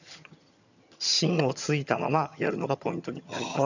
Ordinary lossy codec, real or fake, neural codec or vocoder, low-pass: none; fake; vocoder, 22.05 kHz, 80 mel bands, HiFi-GAN; 7.2 kHz